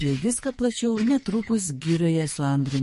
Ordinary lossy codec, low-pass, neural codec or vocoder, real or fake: MP3, 48 kbps; 14.4 kHz; codec, 44.1 kHz, 2.6 kbps, SNAC; fake